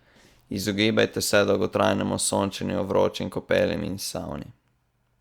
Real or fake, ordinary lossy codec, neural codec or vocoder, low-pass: real; Opus, 64 kbps; none; 19.8 kHz